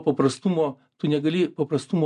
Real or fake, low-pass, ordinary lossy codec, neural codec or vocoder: real; 10.8 kHz; MP3, 96 kbps; none